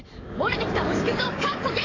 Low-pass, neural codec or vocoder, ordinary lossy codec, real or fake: 7.2 kHz; codec, 44.1 kHz, 7.8 kbps, DAC; AAC, 32 kbps; fake